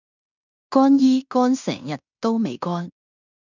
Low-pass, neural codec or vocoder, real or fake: 7.2 kHz; codec, 16 kHz in and 24 kHz out, 0.9 kbps, LongCat-Audio-Codec, fine tuned four codebook decoder; fake